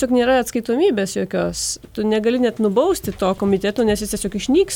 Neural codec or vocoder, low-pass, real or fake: none; 19.8 kHz; real